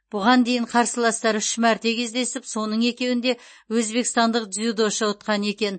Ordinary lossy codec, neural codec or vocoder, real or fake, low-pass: MP3, 32 kbps; none; real; 9.9 kHz